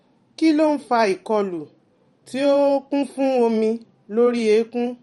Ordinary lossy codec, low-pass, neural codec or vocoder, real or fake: MP3, 48 kbps; 19.8 kHz; vocoder, 44.1 kHz, 128 mel bands every 512 samples, BigVGAN v2; fake